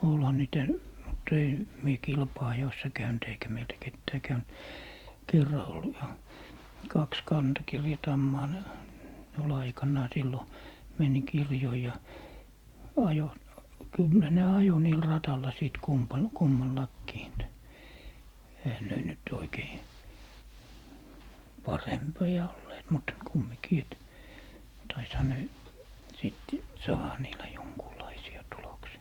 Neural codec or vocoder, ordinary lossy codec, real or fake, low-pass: vocoder, 44.1 kHz, 128 mel bands every 512 samples, BigVGAN v2; Opus, 64 kbps; fake; 19.8 kHz